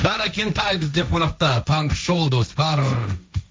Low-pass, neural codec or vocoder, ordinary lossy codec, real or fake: none; codec, 16 kHz, 1.1 kbps, Voila-Tokenizer; none; fake